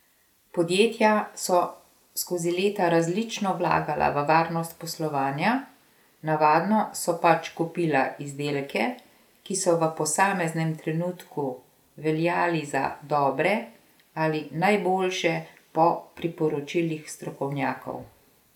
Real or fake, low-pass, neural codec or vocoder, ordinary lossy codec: real; 19.8 kHz; none; none